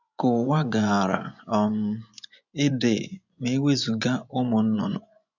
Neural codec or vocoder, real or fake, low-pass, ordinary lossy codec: vocoder, 24 kHz, 100 mel bands, Vocos; fake; 7.2 kHz; none